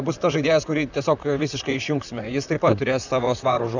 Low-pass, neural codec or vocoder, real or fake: 7.2 kHz; vocoder, 44.1 kHz, 128 mel bands, Pupu-Vocoder; fake